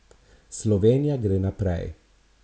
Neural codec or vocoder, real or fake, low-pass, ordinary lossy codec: none; real; none; none